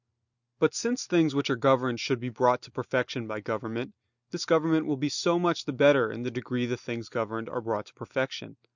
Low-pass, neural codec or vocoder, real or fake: 7.2 kHz; none; real